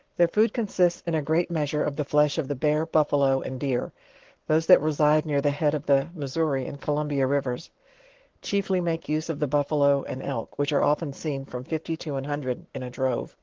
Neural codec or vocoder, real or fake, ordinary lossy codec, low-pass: codec, 44.1 kHz, 7.8 kbps, Pupu-Codec; fake; Opus, 16 kbps; 7.2 kHz